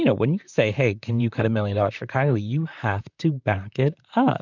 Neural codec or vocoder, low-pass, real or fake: vocoder, 44.1 kHz, 128 mel bands, Pupu-Vocoder; 7.2 kHz; fake